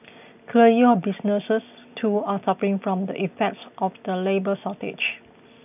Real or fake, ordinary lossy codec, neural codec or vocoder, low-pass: real; none; none; 3.6 kHz